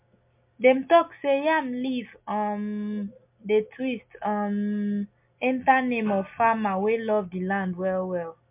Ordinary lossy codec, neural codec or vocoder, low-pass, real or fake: MP3, 32 kbps; none; 3.6 kHz; real